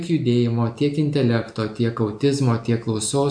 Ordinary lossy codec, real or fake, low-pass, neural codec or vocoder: MP3, 64 kbps; real; 9.9 kHz; none